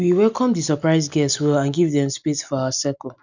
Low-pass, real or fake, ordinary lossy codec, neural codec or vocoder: 7.2 kHz; real; none; none